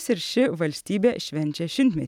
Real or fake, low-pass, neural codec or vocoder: real; 19.8 kHz; none